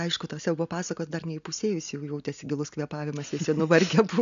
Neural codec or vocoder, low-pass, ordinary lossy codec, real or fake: none; 7.2 kHz; AAC, 96 kbps; real